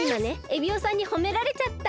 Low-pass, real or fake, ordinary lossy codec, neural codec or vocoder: none; real; none; none